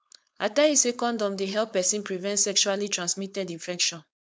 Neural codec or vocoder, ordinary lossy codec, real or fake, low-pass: codec, 16 kHz, 4.8 kbps, FACodec; none; fake; none